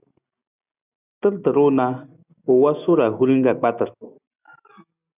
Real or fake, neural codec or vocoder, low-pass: real; none; 3.6 kHz